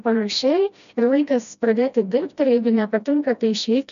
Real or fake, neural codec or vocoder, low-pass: fake; codec, 16 kHz, 1 kbps, FreqCodec, smaller model; 7.2 kHz